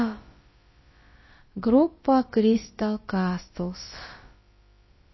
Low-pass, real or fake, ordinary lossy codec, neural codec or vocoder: 7.2 kHz; fake; MP3, 24 kbps; codec, 16 kHz, about 1 kbps, DyCAST, with the encoder's durations